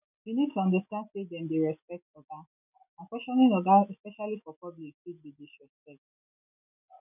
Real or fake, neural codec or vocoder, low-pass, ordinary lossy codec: real; none; 3.6 kHz; none